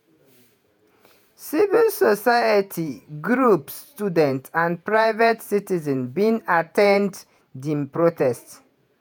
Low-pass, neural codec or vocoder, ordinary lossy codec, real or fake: none; vocoder, 48 kHz, 128 mel bands, Vocos; none; fake